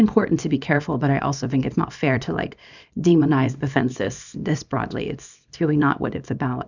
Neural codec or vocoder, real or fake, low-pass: codec, 24 kHz, 0.9 kbps, WavTokenizer, small release; fake; 7.2 kHz